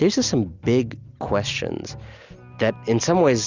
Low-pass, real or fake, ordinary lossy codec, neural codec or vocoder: 7.2 kHz; real; Opus, 64 kbps; none